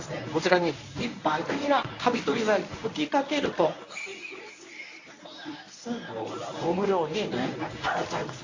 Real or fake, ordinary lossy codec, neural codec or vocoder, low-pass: fake; AAC, 48 kbps; codec, 24 kHz, 0.9 kbps, WavTokenizer, medium speech release version 1; 7.2 kHz